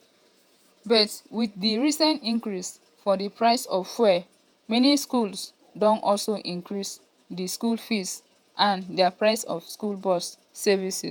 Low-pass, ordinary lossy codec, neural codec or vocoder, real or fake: none; none; vocoder, 48 kHz, 128 mel bands, Vocos; fake